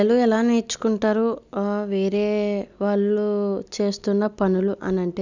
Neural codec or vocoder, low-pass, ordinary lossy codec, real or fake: none; 7.2 kHz; none; real